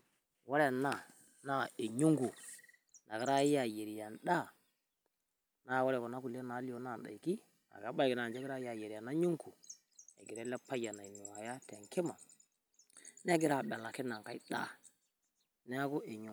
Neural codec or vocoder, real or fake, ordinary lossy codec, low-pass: none; real; none; none